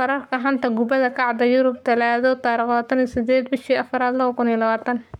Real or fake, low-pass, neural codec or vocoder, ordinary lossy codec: fake; 19.8 kHz; codec, 44.1 kHz, 7.8 kbps, Pupu-Codec; none